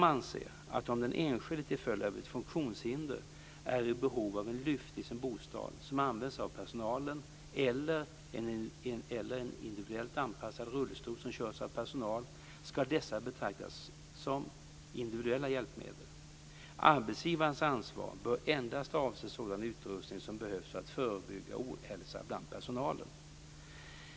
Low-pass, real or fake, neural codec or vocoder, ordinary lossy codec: none; real; none; none